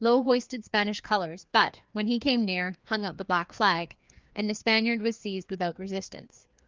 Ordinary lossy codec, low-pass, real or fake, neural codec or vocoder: Opus, 24 kbps; 7.2 kHz; fake; codec, 16 kHz, 2 kbps, FreqCodec, larger model